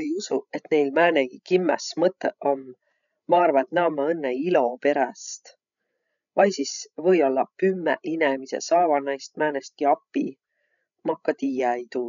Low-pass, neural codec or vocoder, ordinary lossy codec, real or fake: 7.2 kHz; codec, 16 kHz, 16 kbps, FreqCodec, larger model; none; fake